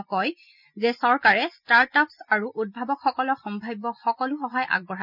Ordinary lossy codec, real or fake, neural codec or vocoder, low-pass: none; real; none; 5.4 kHz